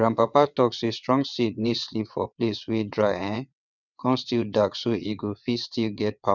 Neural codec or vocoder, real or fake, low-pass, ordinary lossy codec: vocoder, 22.05 kHz, 80 mel bands, WaveNeXt; fake; 7.2 kHz; none